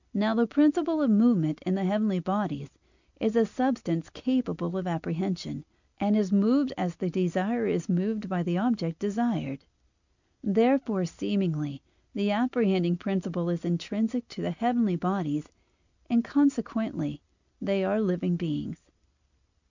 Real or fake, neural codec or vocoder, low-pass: real; none; 7.2 kHz